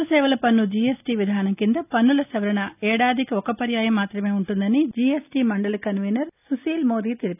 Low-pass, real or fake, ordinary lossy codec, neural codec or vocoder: 3.6 kHz; real; none; none